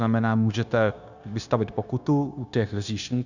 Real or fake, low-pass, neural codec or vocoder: fake; 7.2 kHz; codec, 16 kHz, 0.9 kbps, LongCat-Audio-Codec